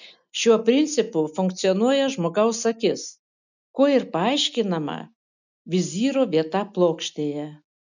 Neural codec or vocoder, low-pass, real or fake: none; 7.2 kHz; real